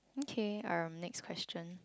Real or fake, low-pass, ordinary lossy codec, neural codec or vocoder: real; none; none; none